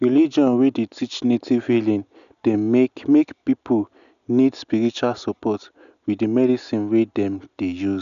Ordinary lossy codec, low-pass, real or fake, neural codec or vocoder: none; 7.2 kHz; real; none